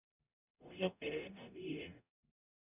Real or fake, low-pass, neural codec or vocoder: fake; 3.6 kHz; codec, 44.1 kHz, 0.9 kbps, DAC